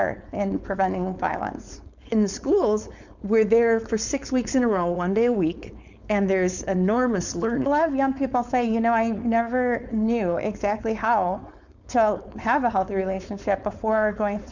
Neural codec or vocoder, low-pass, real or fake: codec, 16 kHz, 4.8 kbps, FACodec; 7.2 kHz; fake